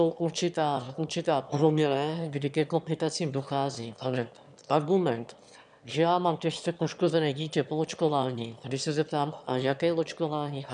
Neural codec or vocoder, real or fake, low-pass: autoencoder, 22.05 kHz, a latent of 192 numbers a frame, VITS, trained on one speaker; fake; 9.9 kHz